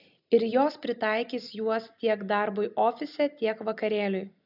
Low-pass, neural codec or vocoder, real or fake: 5.4 kHz; none; real